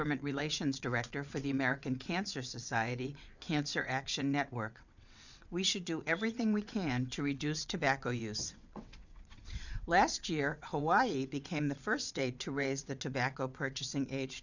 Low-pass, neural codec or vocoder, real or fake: 7.2 kHz; vocoder, 22.05 kHz, 80 mel bands, WaveNeXt; fake